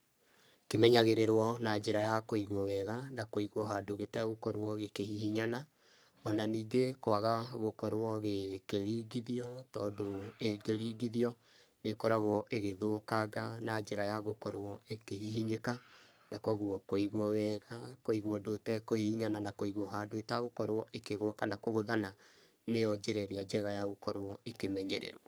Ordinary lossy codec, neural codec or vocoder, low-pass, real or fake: none; codec, 44.1 kHz, 3.4 kbps, Pupu-Codec; none; fake